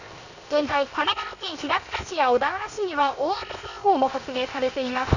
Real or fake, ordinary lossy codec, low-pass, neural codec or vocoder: fake; none; 7.2 kHz; codec, 16 kHz, 0.7 kbps, FocalCodec